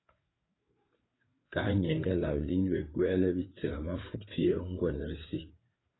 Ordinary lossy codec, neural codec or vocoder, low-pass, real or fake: AAC, 16 kbps; codec, 16 kHz, 4 kbps, FreqCodec, larger model; 7.2 kHz; fake